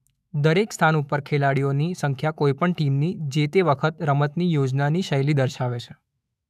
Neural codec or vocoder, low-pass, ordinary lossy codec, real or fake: autoencoder, 48 kHz, 128 numbers a frame, DAC-VAE, trained on Japanese speech; 14.4 kHz; none; fake